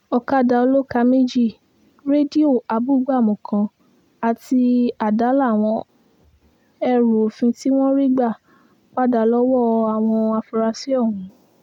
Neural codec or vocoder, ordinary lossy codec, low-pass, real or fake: none; none; 19.8 kHz; real